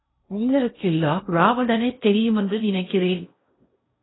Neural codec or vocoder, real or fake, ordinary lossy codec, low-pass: codec, 16 kHz in and 24 kHz out, 0.8 kbps, FocalCodec, streaming, 65536 codes; fake; AAC, 16 kbps; 7.2 kHz